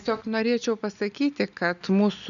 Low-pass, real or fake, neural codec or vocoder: 7.2 kHz; real; none